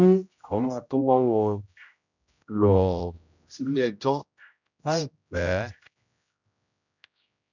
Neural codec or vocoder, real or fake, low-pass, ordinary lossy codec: codec, 16 kHz, 0.5 kbps, X-Codec, HuBERT features, trained on general audio; fake; 7.2 kHz; none